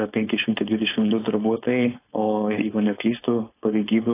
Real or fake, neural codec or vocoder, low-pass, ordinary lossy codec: fake; codec, 16 kHz, 4.8 kbps, FACodec; 3.6 kHz; AAC, 24 kbps